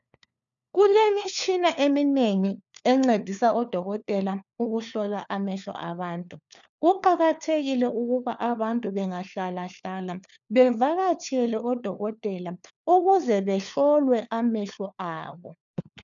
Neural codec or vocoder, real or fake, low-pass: codec, 16 kHz, 4 kbps, FunCodec, trained on LibriTTS, 50 frames a second; fake; 7.2 kHz